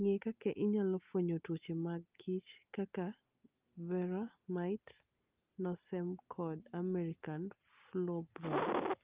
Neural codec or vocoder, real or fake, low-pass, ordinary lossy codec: none; real; 3.6 kHz; Opus, 24 kbps